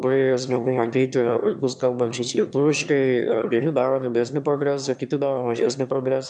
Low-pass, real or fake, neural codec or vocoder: 9.9 kHz; fake; autoencoder, 22.05 kHz, a latent of 192 numbers a frame, VITS, trained on one speaker